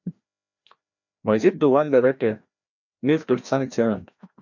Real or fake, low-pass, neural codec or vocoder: fake; 7.2 kHz; codec, 16 kHz, 1 kbps, FreqCodec, larger model